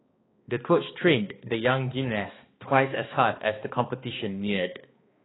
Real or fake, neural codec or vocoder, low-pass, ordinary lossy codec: fake; codec, 16 kHz, 2 kbps, X-Codec, HuBERT features, trained on balanced general audio; 7.2 kHz; AAC, 16 kbps